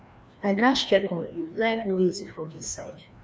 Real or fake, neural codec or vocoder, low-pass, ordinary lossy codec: fake; codec, 16 kHz, 1 kbps, FreqCodec, larger model; none; none